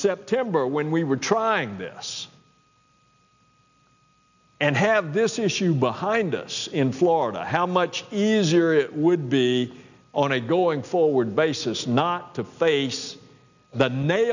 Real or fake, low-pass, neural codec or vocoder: real; 7.2 kHz; none